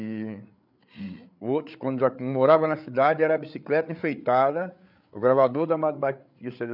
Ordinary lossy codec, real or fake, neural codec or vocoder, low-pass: none; fake; codec, 16 kHz, 8 kbps, FreqCodec, larger model; 5.4 kHz